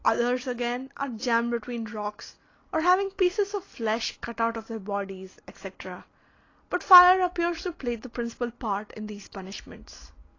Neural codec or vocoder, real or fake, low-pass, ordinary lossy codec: none; real; 7.2 kHz; AAC, 32 kbps